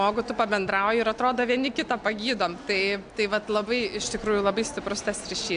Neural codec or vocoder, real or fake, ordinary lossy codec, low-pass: none; real; AAC, 64 kbps; 9.9 kHz